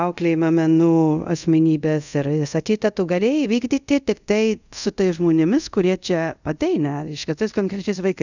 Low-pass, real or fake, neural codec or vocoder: 7.2 kHz; fake; codec, 24 kHz, 0.5 kbps, DualCodec